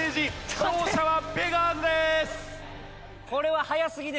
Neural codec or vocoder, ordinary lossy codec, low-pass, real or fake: none; none; none; real